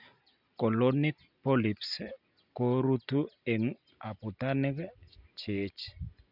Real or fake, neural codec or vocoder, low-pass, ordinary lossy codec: real; none; 5.4 kHz; none